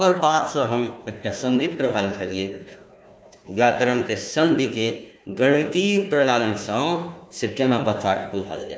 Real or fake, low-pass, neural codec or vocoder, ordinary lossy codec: fake; none; codec, 16 kHz, 1 kbps, FunCodec, trained on Chinese and English, 50 frames a second; none